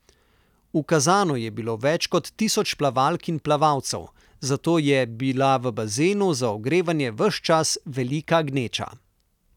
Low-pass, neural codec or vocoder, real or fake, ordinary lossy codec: 19.8 kHz; none; real; none